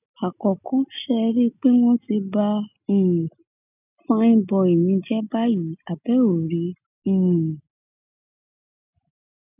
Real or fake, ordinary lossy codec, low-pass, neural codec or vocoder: real; none; 3.6 kHz; none